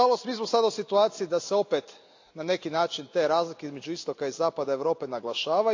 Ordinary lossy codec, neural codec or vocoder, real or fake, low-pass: AAC, 48 kbps; none; real; 7.2 kHz